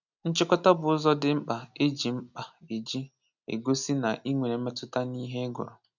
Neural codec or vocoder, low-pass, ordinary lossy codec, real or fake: none; 7.2 kHz; none; real